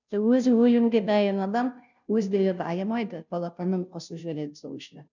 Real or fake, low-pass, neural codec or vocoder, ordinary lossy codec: fake; 7.2 kHz; codec, 16 kHz, 0.5 kbps, FunCodec, trained on Chinese and English, 25 frames a second; none